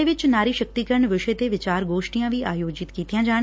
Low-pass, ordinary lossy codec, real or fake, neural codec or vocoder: none; none; real; none